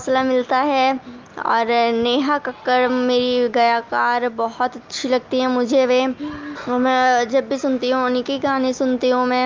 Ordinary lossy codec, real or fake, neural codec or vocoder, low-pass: Opus, 24 kbps; real; none; 7.2 kHz